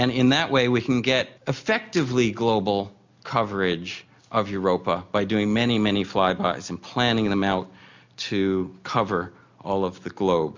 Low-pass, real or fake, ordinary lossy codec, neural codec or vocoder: 7.2 kHz; real; MP3, 64 kbps; none